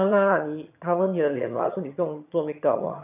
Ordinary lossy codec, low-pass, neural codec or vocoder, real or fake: none; 3.6 kHz; vocoder, 22.05 kHz, 80 mel bands, HiFi-GAN; fake